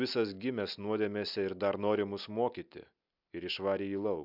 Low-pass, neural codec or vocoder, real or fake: 5.4 kHz; none; real